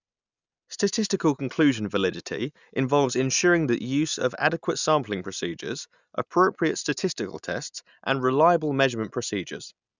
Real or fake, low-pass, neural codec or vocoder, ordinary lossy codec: real; 7.2 kHz; none; none